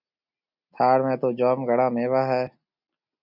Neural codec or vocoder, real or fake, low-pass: none; real; 5.4 kHz